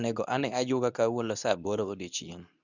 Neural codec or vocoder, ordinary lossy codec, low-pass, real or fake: codec, 24 kHz, 0.9 kbps, WavTokenizer, medium speech release version 2; none; 7.2 kHz; fake